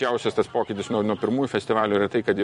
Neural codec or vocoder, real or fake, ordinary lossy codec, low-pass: vocoder, 44.1 kHz, 128 mel bands every 256 samples, BigVGAN v2; fake; MP3, 48 kbps; 14.4 kHz